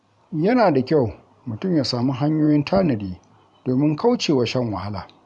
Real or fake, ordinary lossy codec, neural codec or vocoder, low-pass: fake; none; vocoder, 44.1 kHz, 128 mel bands every 512 samples, BigVGAN v2; 10.8 kHz